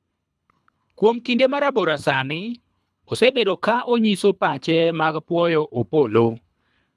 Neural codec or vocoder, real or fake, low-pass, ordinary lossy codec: codec, 24 kHz, 3 kbps, HILCodec; fake; none; none